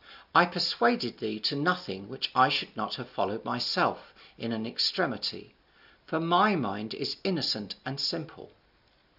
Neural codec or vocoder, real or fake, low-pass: none; real; 5.4 kHz